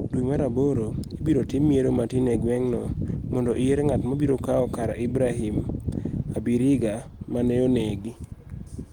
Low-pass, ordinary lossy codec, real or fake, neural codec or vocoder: 19.8 kHz; Opus, 24 kbps; real; none